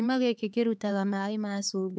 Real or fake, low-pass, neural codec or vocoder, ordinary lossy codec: fake; none; codec, 16 kHz, 4 kbps, X-Codec, HuBERT features, trained on LibriSpeech; none